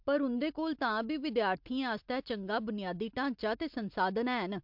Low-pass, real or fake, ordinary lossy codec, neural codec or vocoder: 5.4 kHz; real; MP3, 48 kbps; none